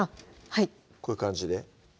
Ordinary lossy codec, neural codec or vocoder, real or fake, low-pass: none; none; real; none